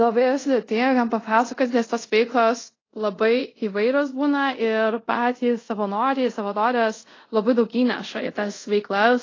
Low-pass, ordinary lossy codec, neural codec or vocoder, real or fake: 7.2 kHz; AAC, 32 kbps; codec, 24 kHz, 0.5 kbps, DualCodec; fake